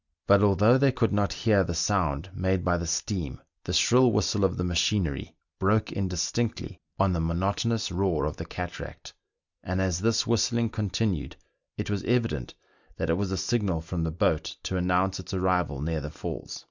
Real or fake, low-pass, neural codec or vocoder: real; 7.2 kHz; none